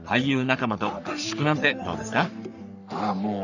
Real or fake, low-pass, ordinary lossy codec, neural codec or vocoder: fake; 7.2 kHz; none; codec, 44.1 kHz, 3.4 kbps, Pupu-Codec